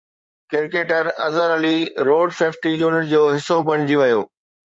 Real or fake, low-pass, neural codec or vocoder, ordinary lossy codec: fake; 9.9 kHz; codec, 16 kHz in and 24 kHz out, 2.2 kbps, FireRedTTS-2 codec; MP3, 48 kbps